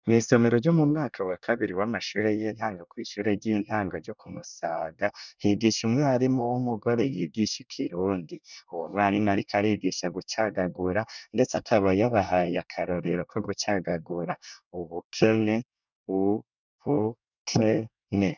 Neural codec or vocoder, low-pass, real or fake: codec, 24 kHz, 1 kbps, SNAC; 7.2 kHz; fake